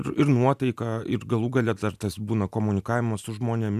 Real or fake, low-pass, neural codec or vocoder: real; 14.4 kHz; none